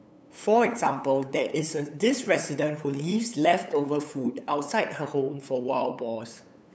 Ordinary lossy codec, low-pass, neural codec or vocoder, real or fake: none; none; codec, 16 kHz, 8 kbps, FunCodec, trained on LibriTTS, 25 frames a second; fake